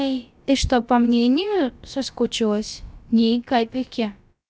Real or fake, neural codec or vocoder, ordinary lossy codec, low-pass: fake; codec, 16 kHz, about 1 kbps, DyCAST, with the encoder's durations; none; none